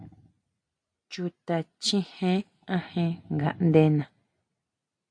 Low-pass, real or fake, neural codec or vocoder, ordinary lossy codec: 9.9 kHz; real; none; AAC, 64 kbps